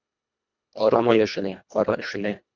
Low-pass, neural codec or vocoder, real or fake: 7.2 kHz; codec, 24 kHz, 1.5 kbps, HILCodec; fake